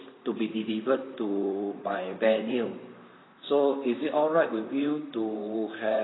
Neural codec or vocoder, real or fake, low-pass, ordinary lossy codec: vocoder, 44.1 kHz, 128 mel bands, Pupu-Vocoder; fake; 7.2 kHz; AAC, 16 kbps